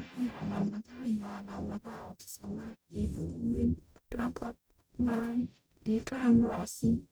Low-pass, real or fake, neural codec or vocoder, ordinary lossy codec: none; fake; codec, 44.1 kHz, 0.9 kbps, DAC; none